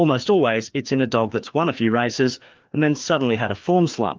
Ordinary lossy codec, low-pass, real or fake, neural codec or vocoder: Opus, 32 kbps; 7.2 kHz; fake; codec, 16 kHz, 2 kbps, FreqCodec, larger model